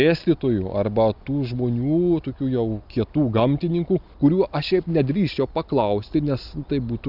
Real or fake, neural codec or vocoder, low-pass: real; none; 5.4 kHz